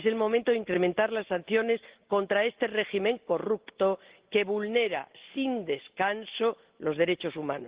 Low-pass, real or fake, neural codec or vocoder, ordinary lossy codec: 3.6 kHz; real; none; Opus, 16 kbps